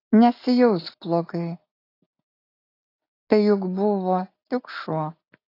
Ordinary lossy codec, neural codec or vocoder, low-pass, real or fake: AAC, 24 kbps; none; 5.4 kHz; real